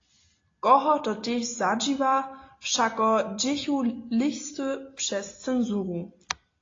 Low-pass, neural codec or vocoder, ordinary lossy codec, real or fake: 7.2 kHz; none; AAC, 32 kbps; real